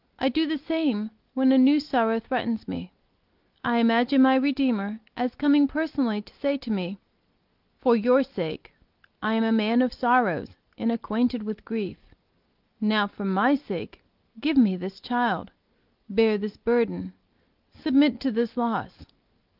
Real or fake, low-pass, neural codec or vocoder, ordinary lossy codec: real; 5.4 kHz; none; Opus, 32 kbps